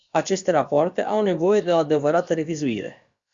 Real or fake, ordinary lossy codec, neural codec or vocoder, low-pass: fake; Opus, 64 kbps; codec, 16 kHz, about 1 kbps, DyCAST, with the encoder's durations; 7.2 kHz